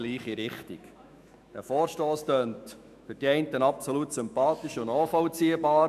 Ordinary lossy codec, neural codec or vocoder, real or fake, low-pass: none; autoencoder, 48 kHz, 128 numbers a frame, DAC-VAE, trained on Japanese speech; fake; 14.4 kHz